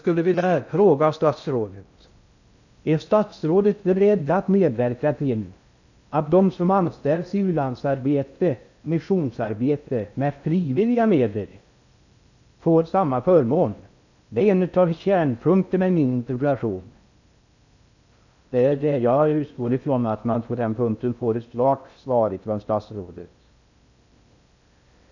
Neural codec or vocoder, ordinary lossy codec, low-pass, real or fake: codec, 16 kHz in and 24 kHz out, 0.6 kbps, FocalCodec, streaming, 2048 codes; none; 7.2 kHz; fake